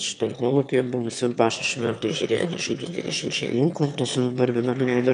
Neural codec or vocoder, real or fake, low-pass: autoencoder, 22.05 kHz, a latent of 192 numbers a frame, VITS, trained on one speaker; fake; 9.9 kHz